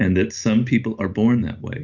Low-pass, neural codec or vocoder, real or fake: 7.2 kHz; none; real